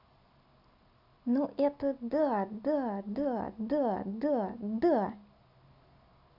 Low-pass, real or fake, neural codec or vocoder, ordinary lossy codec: 5.4 kHz; real; none; none